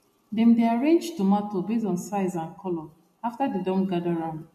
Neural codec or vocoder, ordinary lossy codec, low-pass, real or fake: none; MP3, 64 kbps; 14.4 kHz; real